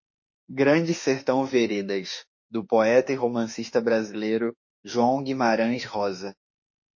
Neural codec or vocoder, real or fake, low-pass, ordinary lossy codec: autoencoder, 48 kHz, 32 numbers a frame, DAC-VAE, trained on Japanese speech; fake; 7.2 kHz; MP3, 32 kbps